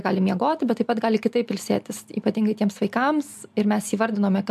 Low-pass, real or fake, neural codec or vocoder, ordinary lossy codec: 14.4 kHz; real; none; MP3, 96 kbps